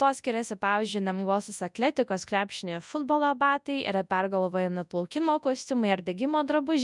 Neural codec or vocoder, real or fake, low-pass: codec, 24 kHz, 0.9 kbps, WavTokenizer, large speech release; fake; 10.8 kHz